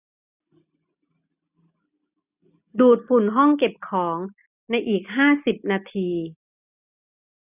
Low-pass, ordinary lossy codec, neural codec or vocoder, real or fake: 3.6 kHz; none; none; real